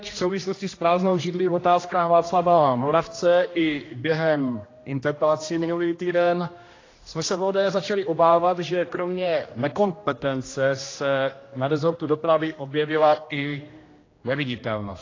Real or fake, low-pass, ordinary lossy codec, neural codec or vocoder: fake; 7.2 kHz; AAC, 32 kbps; codec, 16 kHz, 1 kbps, X-Codec, HuBERT features, trained on general audio